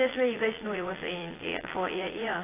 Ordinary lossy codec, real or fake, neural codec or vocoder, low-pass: AAC, 16 kbps; fake; vocoder, 22.05 kHz, 80 mel bands, Vocos; 3.6 kHz